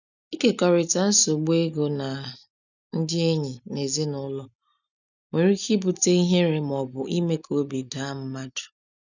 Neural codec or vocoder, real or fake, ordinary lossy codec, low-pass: none; real; none; 7.2 kHz